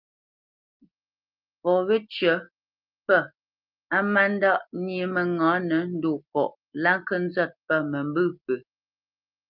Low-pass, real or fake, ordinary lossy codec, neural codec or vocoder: 5.4 kHz; real; Opus, 24 kbps; none